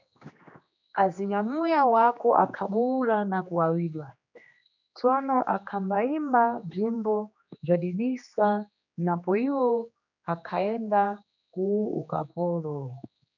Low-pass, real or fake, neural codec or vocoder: 7.2 kHz; fake; codec, 16 kHz, 2 kbps, X-Codec, HuBERT features, trained on general audio